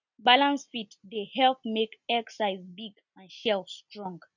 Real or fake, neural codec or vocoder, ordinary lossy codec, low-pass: real; none; none; 7.2 kHz